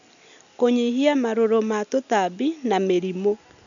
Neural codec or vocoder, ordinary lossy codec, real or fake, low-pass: none; none; real; 7.2 kHz